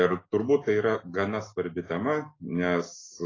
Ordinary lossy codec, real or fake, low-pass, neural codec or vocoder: AAC, 32 kbps; real; 7.2 kHz; none